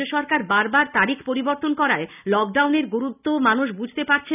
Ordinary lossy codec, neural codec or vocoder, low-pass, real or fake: none; none; 3.6 kHz; real